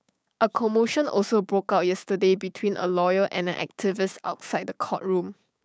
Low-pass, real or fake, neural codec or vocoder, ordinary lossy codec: none; fake; codec, 16 kHz, 6 kbps, DAC; none